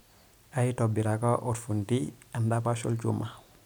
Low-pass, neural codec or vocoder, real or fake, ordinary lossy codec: none; none; real; none